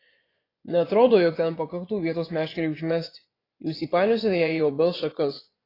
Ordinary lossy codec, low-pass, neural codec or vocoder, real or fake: AAC, 24 kbps; 5.4 kHz; vocoder, 24 kHz, 100 mel bands, Vocos; fake